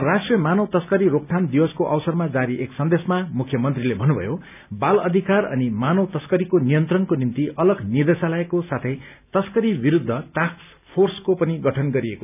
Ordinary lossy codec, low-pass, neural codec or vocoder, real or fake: none; 3.6 kHz; none; real